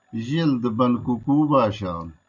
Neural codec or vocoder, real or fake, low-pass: none; real; 7.2 kHz